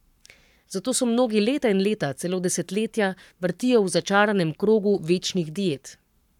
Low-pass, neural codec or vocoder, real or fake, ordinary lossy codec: 19.8 kHz; codec, 44.1 kHz, 7.8 kbps, Pupu-Codec; fake; none